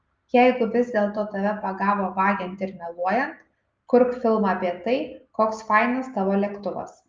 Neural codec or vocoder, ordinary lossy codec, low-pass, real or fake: none; Opus, 24 kbps; 7.2 kHz; real